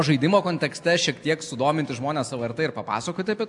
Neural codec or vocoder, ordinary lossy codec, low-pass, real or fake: none; AAC, 64 kbps; 10.8 kHz; real